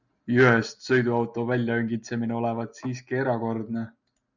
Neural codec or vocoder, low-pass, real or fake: none; 7.2 kHz; real